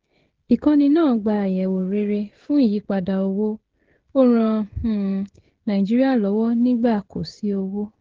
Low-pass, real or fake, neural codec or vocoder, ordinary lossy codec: 7.2 kHz; fake; codec, 16 kHz, 16 kbps, FreqCodec, smaller model; Opus, 16 kbps